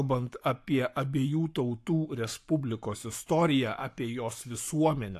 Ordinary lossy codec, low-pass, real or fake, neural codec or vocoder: AAC, 64 kbps; 14.4 kHz; fake; codec, 44.1 kHz, 7.8 kbps, Pupu-Codec